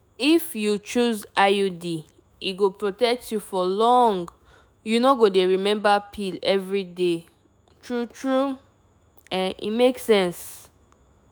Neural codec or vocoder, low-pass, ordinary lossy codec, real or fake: autoencoder, 48 kHz, 128 numbers a frame, DAC-VAE, trained on Japanese speech; none; none; fake